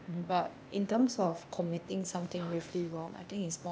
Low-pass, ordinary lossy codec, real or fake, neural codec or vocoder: none; none; fake; codec, 16 kHz, 0.8 kbps, ZipCodec